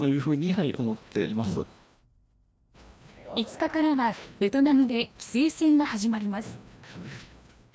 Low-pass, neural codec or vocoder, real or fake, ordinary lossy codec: none; codec, 16 kHz, 1 kbps, FreqCodec, larger model; fake; none